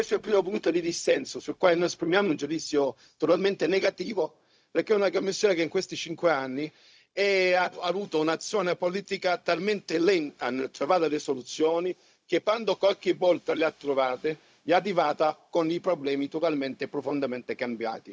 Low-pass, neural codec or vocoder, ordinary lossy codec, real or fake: none; codec, 16 kHz, 0.4 kbps, LongCat-Audio-Codec; none; fake